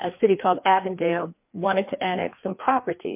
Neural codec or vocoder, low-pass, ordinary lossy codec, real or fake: codec, 16 kHz, 2 kbps, FreqCodec, larger model; 3.6 kHz; MP3, 32 kbps; fake